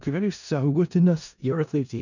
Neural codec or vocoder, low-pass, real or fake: codec, 16 kHz in and 24 kHz out, 0.4 kbps, LongCat-Audio-Codec, four codebook decoder; 7.2 kHz; fake